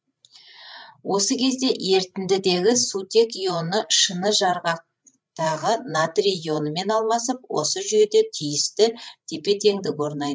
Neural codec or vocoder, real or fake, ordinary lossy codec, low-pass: codec, 16 kHz, 8 kbps, FreqCodec, larger model; fake; none; none